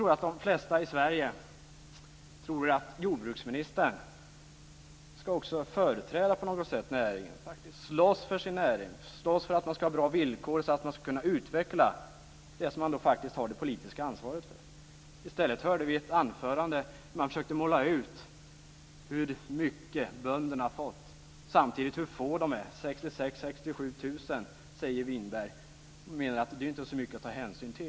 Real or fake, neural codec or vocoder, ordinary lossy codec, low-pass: real; none; none; none